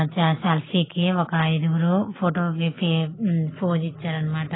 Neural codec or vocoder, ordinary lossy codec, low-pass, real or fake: none; AAC, 16 kbps; 7.2 kHz; real